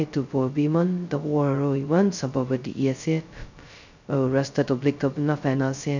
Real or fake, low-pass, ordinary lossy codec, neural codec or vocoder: fake; 7.2 kHz; none; codec, 16 kHz, 0.2 kbps, FocalCodec